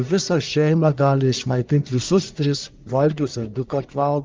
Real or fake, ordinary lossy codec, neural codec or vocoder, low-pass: fake; Opus, 24 kbps; codec, 44.1 kHz, 1.7 kbps, Pupu-Codec; 7.2 kHz